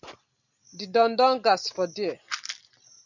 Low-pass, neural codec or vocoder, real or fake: 7.2 kHz; none; real